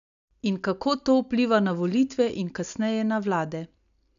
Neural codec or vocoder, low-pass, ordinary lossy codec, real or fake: none; 7.2 kHz; none; real